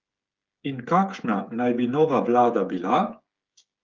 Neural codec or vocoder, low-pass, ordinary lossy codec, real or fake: codec, 16 kHz, 8 kbps, FreqCodec, smaller model; 7.2 kHz; Opus, 32 kbps; fake